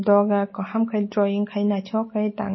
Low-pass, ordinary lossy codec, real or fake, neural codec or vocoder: 7.2 kHz; MP3, 24 kbps; real; none